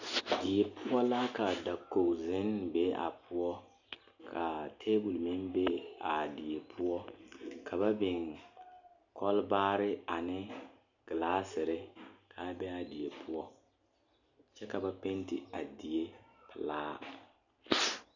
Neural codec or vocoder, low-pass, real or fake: none; 7.2 kHz; real